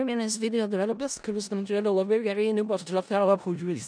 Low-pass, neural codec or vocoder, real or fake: 9.9 kHz; codec, 16 kHz in and 24 kHz out, 0.4 kbps, LongCat-Audio-Codec, four codebook decoder; fake